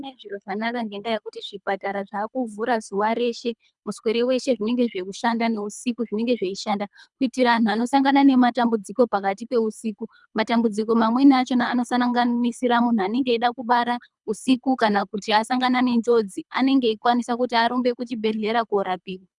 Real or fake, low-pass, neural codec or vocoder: fake; 10.8 kHz; codec, 24 kHz, 3 kbps, HILCodec